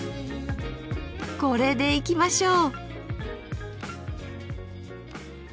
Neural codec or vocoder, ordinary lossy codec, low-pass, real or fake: none; none; none; real